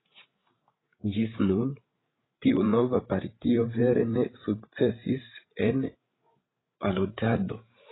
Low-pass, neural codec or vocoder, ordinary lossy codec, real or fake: 7.2 kHz; codec, 16 kHz, 16 kbps, FreqCodec, larger model; AAC, 16 kbps; fake